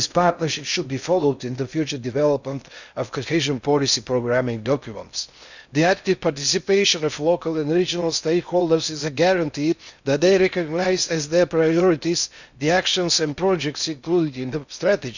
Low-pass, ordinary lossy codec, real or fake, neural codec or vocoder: 7.2 kHz; none; fake; codec, 16 kHz in and 24 kHz out, 0.6 kbps, FocalCodec, streaming, 4096 codes